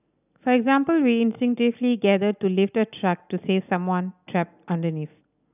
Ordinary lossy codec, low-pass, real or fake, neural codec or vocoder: none; 3.6 kHz; real; none